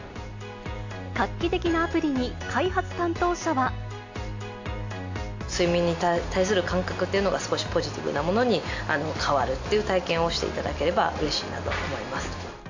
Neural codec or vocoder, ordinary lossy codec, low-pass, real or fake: none; AAC, 48 kbps; 7.2 kHz; real